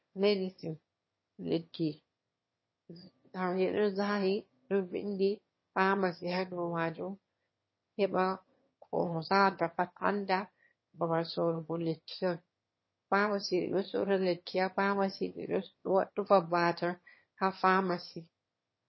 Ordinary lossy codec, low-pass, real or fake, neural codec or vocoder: MP3, 24 kbps; 7.2 kHz; fake; autoencoder, 22.05 kHz, a latent of 192 numbers a frame, VITS, trained on one speaker